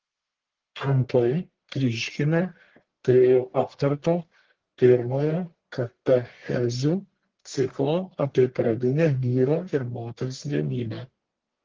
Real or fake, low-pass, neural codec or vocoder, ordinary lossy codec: fake; 7.2 kHz; codec, 44.1 kHz, 1.7 kbps, Pupu-Codec; Opus, 16 kbps